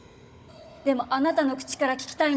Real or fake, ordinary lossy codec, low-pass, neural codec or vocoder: fake; none; none; codec, 16 kHz, 16 kbps, FunCodec, trained on Chinese and English, 50 frames a second